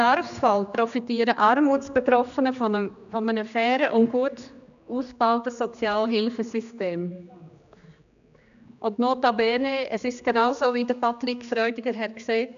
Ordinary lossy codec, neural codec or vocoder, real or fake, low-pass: none; codec, 16 kHz, 2 kbps, X-Codec, HuBERT features, trained on general audio; fake; 7.2 kHz